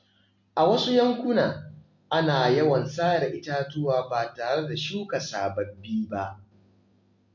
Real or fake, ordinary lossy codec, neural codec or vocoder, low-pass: real; MP3, 48 kbps; none; 7.2 kHz